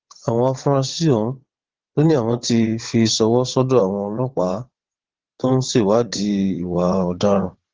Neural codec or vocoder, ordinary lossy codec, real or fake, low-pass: vocoder, 22.05 kHz, 80 mel bands, WaveNeXt; Opus, 16 kbps; fake; 7.2 kHz